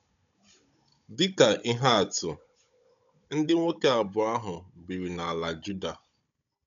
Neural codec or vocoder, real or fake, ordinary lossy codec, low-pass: codec, 16 kHz, 16 kbps, FunCodec, trained on Chinese and English, 50 frames a second; fake; none; 7.2 kHz